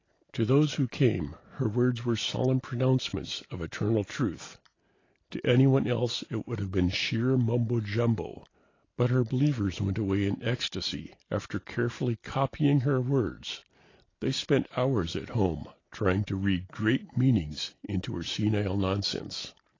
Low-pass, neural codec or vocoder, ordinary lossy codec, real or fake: 7.2 kHz; none; AAC, 32 kbps; real